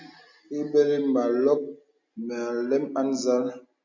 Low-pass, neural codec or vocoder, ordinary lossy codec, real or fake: 7.2 kHz; none; MP3, 64 kbps; real